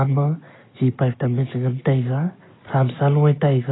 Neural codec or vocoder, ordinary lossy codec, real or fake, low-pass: none; AAC, 16 kbps; real; 7.2 kHz